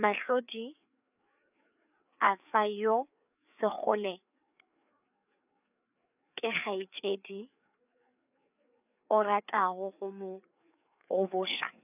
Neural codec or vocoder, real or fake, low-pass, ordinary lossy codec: codec, 16 kHz, 4 kbps, FreqCodec, larger model; fake; 3.6 kHz; none